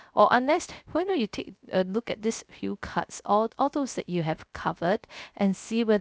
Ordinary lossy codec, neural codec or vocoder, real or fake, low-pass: none; codec, 16 kHz, 0.3 kbps, FocalCodec; fake; none